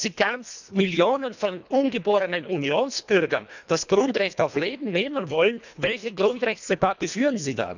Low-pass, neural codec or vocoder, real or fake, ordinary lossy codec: 7.2 kHz; codec, 24 kHz, 1.5 kbps, HILCodec; fake; none